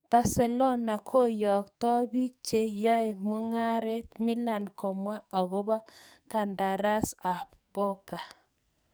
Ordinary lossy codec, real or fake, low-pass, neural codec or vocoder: none; fake; none; codec, 44.1 kHz, 2.6 kbps, SNAC